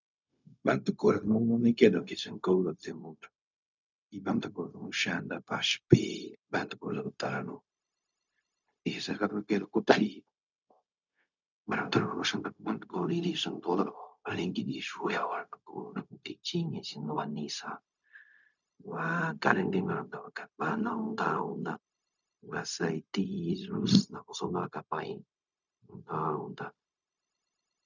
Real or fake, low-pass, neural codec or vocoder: fake; 7.2 kHz; codec, 16 kHz, 0.4 kbps, LongCat-Audio-Codec